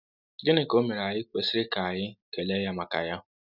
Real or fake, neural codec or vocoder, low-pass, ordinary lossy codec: real; none; 5.4 kHz; none